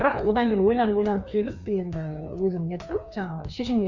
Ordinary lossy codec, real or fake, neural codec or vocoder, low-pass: none; fake; codec, 16 kHz, 2 kbps, FreqCodec, larger model; 7.2 kHz